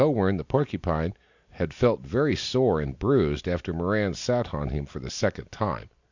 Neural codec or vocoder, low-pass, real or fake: none; 7.2 kHz; real